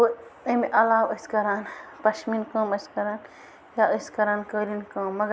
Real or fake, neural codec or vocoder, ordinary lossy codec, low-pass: real; none; none; none